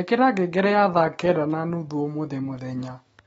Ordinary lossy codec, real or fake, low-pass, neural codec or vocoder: AAC, 24 kbps; fake; 19.8 kHz; autoencoder, 48 kHz, 128 numbers a frame, DAC-VAE, trained on Japanese speech